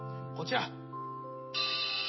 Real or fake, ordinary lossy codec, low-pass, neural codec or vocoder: real; MP3, 24 kbps; 7.2 kHz; none